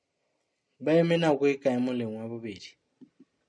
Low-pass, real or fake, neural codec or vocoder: 9.9 kHz; real; none